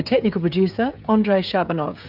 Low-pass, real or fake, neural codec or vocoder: 5.4 kHz; fake; codec, 16 kHz, 16 kbps, FreqCodec, smaller model